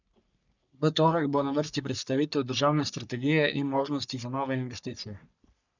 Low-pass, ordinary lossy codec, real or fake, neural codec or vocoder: 7.2 kHz; none; fake; codec, 44.1 kHz, 3.4 kbps, Pupu-Codec